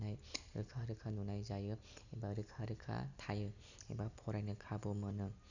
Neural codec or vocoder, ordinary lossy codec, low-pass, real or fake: none; none; 7.2 kHz; real